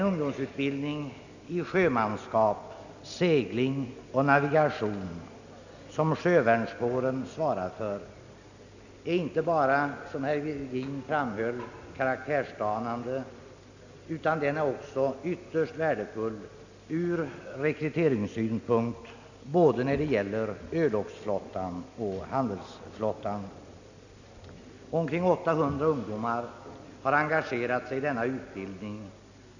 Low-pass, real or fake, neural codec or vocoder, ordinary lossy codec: 7.2 kHz; real; none; none